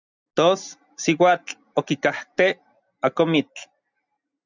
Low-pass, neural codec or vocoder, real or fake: 7.2 kHz; none; real